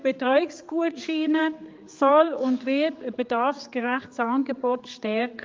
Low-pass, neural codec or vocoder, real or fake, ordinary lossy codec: 7.2 kHz; codec, 16 kHz, 4 kbps, X-Codec, HuBERT features, trained on general audio; fake; Opus, 32 kbps